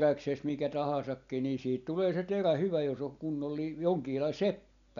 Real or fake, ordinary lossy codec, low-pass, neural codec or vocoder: real; MP3, 96 kbps; 7.2 kHz; none